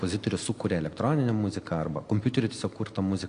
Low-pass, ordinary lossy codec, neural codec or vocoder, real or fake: 9.9 kHz; AAC, 48 kbps; none; real